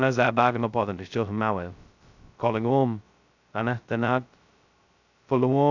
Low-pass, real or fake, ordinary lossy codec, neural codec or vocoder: 7.2 kHz; fake; none; codec, 16 kHz, 0.2 kbps, FocalCodec